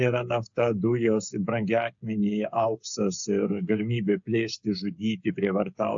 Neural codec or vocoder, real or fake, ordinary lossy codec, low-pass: codec, 16 kHz, 8 kbps, FreqCodec, smaller model; fake; AAC, 64 kbps; 7.2 kHz